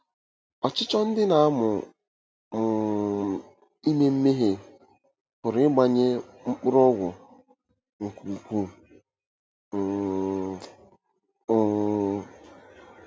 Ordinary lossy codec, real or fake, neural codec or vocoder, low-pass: none; real; none; none